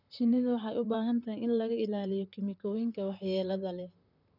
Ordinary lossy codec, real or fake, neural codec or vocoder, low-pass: none; fake; vocoder, 44.1 kHz, 128 mel bands, Pupu-Vocoder; 5.4 kHz